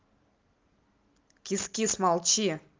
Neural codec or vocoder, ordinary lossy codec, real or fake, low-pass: none; Opus, 32 kbps; real; 7.2 kHz